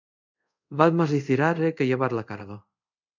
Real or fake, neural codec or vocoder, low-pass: fake; codec, 24 kHz, 0.5 kbps, DualCodec; 7.2 kHz